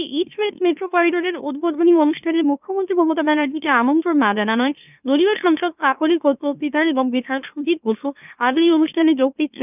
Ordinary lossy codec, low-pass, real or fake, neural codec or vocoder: none; 3.6 kHz; fake; autoencoder, 44.1 kHz, a latent of 192 numbers a frame, MeloTTS